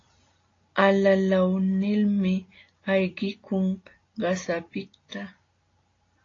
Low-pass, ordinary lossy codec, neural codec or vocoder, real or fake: 7.2 kHz; AAC, 32 kbps; none; real